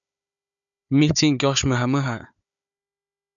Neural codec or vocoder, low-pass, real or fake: codec, 16 kHz, 4 kbps, FunCodec, trained on Chinese and English, 50 frames a second; 7.2 kHz; fake